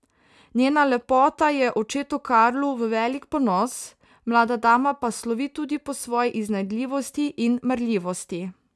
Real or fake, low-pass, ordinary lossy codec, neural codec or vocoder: real; none; none; none